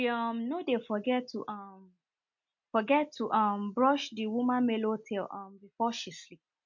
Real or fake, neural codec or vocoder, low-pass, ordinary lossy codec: real; none; 7.2 kHz; MP3, 48 kbps